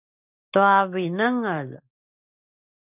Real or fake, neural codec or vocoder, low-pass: real; none; 3.6 kHz